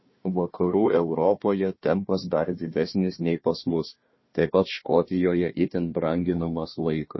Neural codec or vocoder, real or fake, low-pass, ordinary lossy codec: codec, 16 kHz, 1 kbps, FunCodec, trained on Chinese and English, 50 frames a second; fake; 7.2 kHz; MP3, 24 kbps